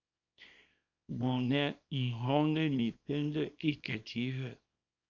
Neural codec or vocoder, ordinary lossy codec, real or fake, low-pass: codec, 24 kHz, 0.9 kbps, WavTokenizer, small release; Opus, 64 kbps; fake; 7.2 kHz